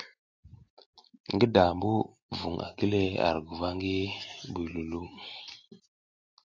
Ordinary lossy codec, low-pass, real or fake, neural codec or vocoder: AAC, 48 kbps; 7.2 kHz; real; none